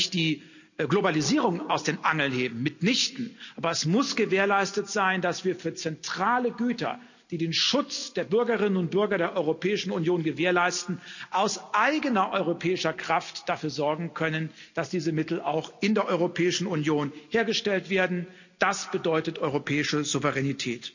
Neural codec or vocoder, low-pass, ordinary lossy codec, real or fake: none; 7.2 kHz; none; real